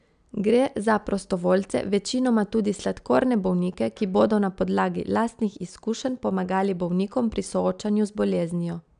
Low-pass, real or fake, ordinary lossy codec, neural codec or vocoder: 9.9 kHz; real; none; none